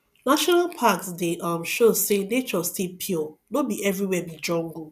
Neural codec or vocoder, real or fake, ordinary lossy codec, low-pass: none; real; none; 14.4 kHz